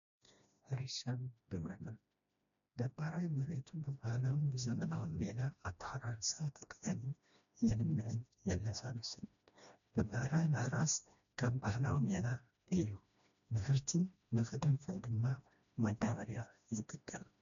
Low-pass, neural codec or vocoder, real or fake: 7.2 kHz; codec, 16 kHz, 1 kbps, FreqCodec, smaller model; fake